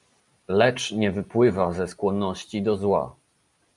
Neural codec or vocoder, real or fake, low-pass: none; real; 10.8 kHz